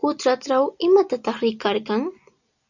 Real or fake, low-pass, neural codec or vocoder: real; 7.2 kHz; none